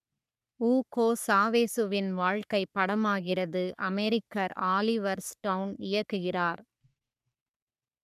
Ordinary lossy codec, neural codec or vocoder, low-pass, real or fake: none; codec, 44.1 kHz, 3.4 kbps, Pupu-Codec; 14.4 kHz; fake